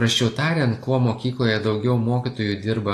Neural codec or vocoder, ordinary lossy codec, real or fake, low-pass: none; AAC, 48 kbps; real; 14.4 kHz